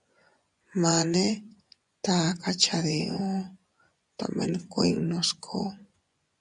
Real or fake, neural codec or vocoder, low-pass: fake; vocoder, 24 kHz, 100 mel bands, Vocos; 10.8 kHz